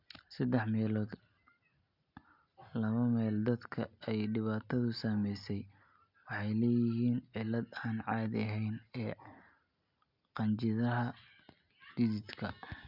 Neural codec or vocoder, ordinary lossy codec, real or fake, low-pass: none; none; real; 5.4 kHz